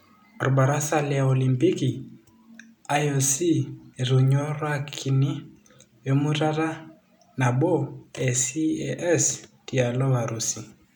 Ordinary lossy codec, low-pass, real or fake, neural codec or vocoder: none; 19.8 kHz; real; none